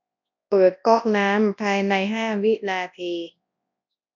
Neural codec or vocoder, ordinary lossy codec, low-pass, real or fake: codec, 24 kHz, 0.9 kbps, WavTokenizer, large speech release; none; 7.2 kHz; fake